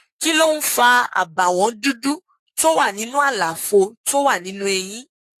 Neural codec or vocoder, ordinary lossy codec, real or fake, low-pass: codec, 44.1 kHz, 3.4 kbps, Pupu-Codec; AAC, 64 kbps; fake; 14.4 kHz